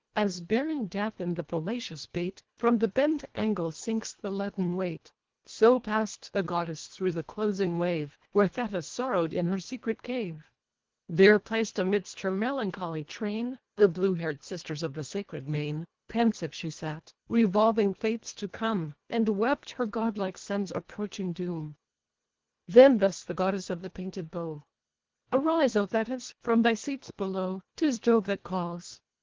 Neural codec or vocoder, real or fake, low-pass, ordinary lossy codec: codec, 24 kHz, 1.5 kbps, HILCodec; fake; 7.2 kHz; Opus, 16 kbps